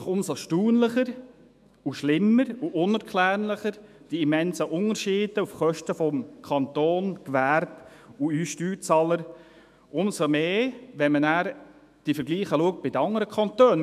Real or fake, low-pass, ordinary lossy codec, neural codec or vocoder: fake; 14.4 kHz; MP3, 96 kbps; autoencoder, 48 kHz, 128 numbers a frame, DAC-VAE, trained on Japanese speech